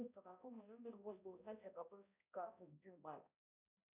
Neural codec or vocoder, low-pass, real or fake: codec, 16 kHz, 0.5 kbps, X-Codec, HuBERT features, trained on balanced general audio; 3.6 kHz; fake